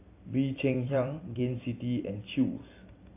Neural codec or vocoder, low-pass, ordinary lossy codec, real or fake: vocoder, 44.1 kHz, 128 mel bands every 256 samples, BigVGAN v2; 3.6 kHz; AAC, 24 kbps; fake